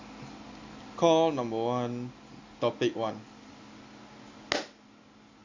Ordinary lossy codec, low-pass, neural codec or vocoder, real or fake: AAC, 48 kbps; 7.2 kHz; none; real